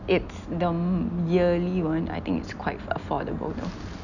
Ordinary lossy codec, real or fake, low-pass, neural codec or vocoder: none; real; 7.2 kHz; none